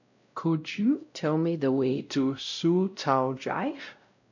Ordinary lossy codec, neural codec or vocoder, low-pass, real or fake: none; codec, 16 kHz, 0.5 kbps, X-Codec, WavLM features, trained on Multilingual LibriSpeech; 7.2 kHz; fake